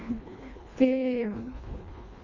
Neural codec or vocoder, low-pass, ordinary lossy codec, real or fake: codec, 24 kHz, 1.5 kbps, HILCodec; 7.2 kHz; none; fake